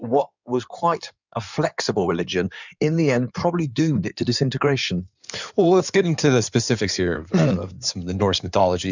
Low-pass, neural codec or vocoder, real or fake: 7.2 kHz; codec, 16 kHz in and 24 kHz out, 2.2 kbps, FireRedTTS-2 codec; fake